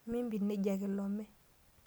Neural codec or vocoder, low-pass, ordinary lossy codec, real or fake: none; none; none; real